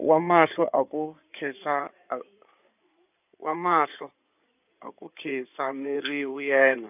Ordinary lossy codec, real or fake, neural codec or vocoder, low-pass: none; fake; codec, 16 kHz in and 24 kHz out, 2.2 kbps, FireRedTTS-2 codec; 3.6 kHz